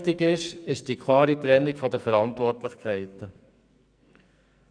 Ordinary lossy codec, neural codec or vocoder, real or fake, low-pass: none; codec, 44.1 kHz, 2.6 kbps, SNAC; fake; 9.9 kHz